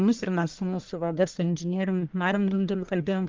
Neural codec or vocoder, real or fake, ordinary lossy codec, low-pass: codec, 44.1 kHz, 1.7 kbps, Pupu-Codec; fake; Opus, 24 kbps; 7.2 kHz